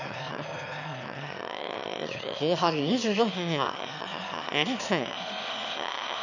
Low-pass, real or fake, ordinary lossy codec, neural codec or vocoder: 7.2 kHz; fake; none; autoencoder, 22.05 kHz, a latent of 192 numbers a frame, VITS, trained on one speaker